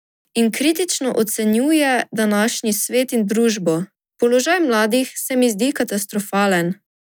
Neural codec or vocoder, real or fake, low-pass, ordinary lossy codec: none; real; none; none